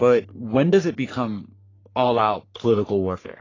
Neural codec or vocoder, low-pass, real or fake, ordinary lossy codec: codec, 44.1 kHz, 3.4 kbps, Pupu-Codec; 7.2 kHz; fake; AAC, 32 kbps